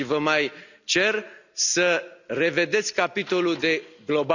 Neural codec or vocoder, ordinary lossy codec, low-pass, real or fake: none; none; 7.2 kHz; real